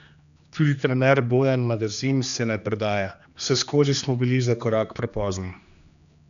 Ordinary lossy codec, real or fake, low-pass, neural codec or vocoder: none; fake; 7.2 kHz; codec, 16 kHz, 2 kbps, X-Codec, HuBERT features, trained on general audio